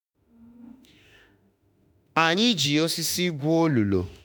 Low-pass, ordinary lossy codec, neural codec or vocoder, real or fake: none; none; autoencoder, 48 kHz, 32 numbers a frame, DAC-VAE, trained on Japanese speech; fake